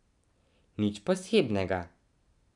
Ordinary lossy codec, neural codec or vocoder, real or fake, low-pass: none; none; real; 10.8 kHz